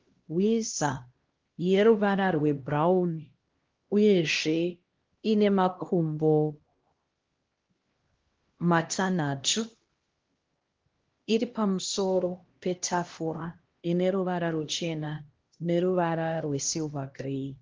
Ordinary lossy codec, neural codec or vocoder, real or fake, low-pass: Opus, 16 kbps; codec, 16 kHz, 1 kbps, X-Codec, HuBERT features, trained on LibriSpeech; fake; 7.2 kHz